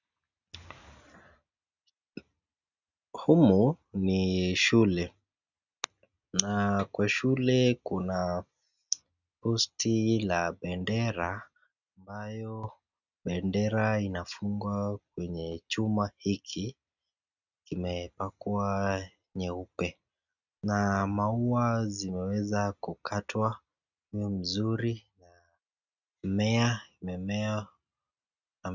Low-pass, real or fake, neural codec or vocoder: 7.2 kHz; real; none